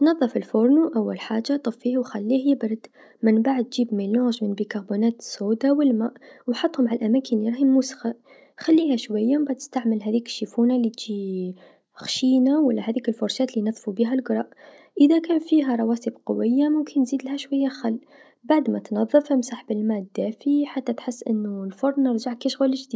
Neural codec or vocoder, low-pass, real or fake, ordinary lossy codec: none; none; real; none